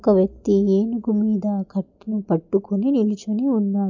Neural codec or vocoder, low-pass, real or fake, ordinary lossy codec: none; 7.2 kHz; real; none